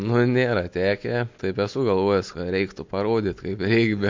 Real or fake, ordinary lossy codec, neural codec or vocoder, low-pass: real; MP3, 48 kbps; none; 7.2 kHz